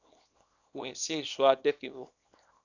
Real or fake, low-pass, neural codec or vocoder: fake; 7.2 kHz; codec, 24 kHz, 0.9 kbps, WavTokenizer, small release